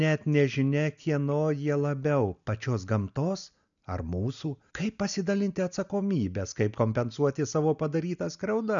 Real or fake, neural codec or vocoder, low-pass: real; none; 7.2 kHz